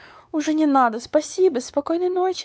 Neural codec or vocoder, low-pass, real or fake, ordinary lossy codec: codec, 16 kHz, 4 kbps, X-Codec, WavLM features, trained on Multilingual LibriSpeech; none; fake; none